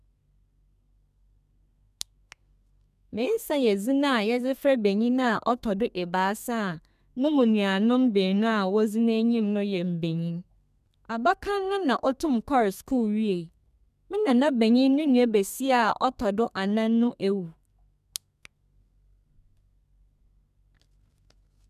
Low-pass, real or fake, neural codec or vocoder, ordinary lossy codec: 14.4 kHz; fake; codec, 32 kHz, 1.9 kbps, SNAC; none